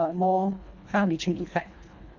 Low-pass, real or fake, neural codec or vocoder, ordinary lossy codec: 7.2 kHz; fake; codec, 24 kHz, 1.5 kbps, HILCodec; none